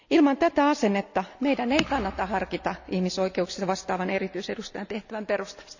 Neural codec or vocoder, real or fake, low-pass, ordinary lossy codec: none; real; 7.2 kHz; none